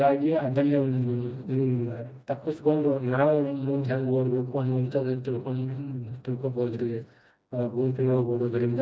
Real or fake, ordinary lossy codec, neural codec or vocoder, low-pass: fake; none; codec, 16 kHz, 1 kbps, FreqCodec, smaller model; none